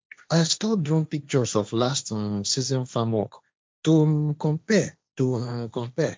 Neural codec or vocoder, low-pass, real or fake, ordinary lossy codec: codec, 16 kHz, 1.1 kbps, Voila-Tokenizer; none; fake; none